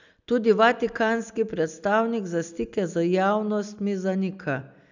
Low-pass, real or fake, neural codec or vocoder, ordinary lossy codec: 7.2 kHz; real; none; none